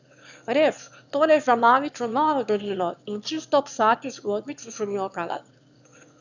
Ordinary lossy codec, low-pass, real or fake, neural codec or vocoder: none; 7.2 kHz; fake; autoencoder, 22.05 kHz, a latent of 192 numbers a frame, VITS, trained on one speaker